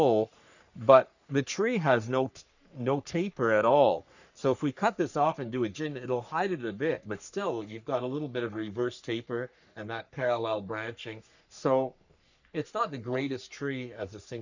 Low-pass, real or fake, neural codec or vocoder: 7.2 kHz; fake; codec, 44.1 kHz, 3.4 kbps, Pupu-Codec